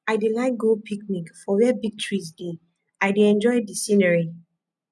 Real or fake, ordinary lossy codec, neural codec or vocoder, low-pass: real; none; none; none